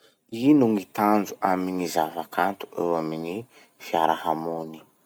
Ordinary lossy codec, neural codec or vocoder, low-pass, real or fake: none; none; none; real